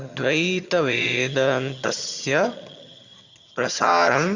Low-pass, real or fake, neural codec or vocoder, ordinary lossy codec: 7.2 kHz; fake; vocoder, 22.05 kHz, 80 mel bands, HiFi-GAN; Opus, 64 kbps